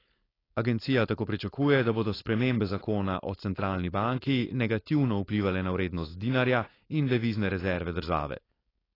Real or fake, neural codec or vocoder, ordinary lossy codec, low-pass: fake; codec, 16 kHz, 4.8 kbps, FACodec; AAC, 24 kbps; 5.4 kHz